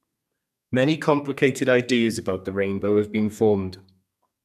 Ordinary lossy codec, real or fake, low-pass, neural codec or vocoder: none; fake; 14.4 kHz; codec, 32 kHz, 1.9 kbps, SNAC